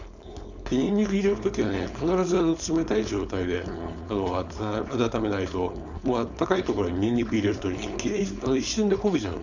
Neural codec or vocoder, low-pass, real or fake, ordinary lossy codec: codec, 16 kHz, 4.8 kbps, FACodec; 7.2 kHz; fake; none